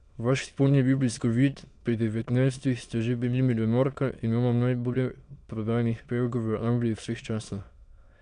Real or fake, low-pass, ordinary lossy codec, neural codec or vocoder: fake; 9.9 kHz; none; autoencoder, 22.05 kHz, a latent of 192 numbers a frame, VITS, trained on many speakers